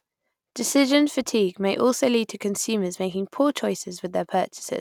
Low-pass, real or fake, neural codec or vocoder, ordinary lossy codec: 19.8 kHz; real; none; none